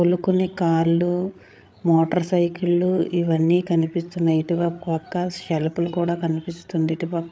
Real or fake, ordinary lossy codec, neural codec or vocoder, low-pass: fake; none; codec, 16 kHz, 8 kbps, FreqCodec, larger model; none